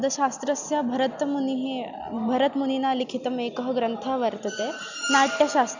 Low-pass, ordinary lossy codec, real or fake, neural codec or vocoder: 7.2 kHz; none; real; none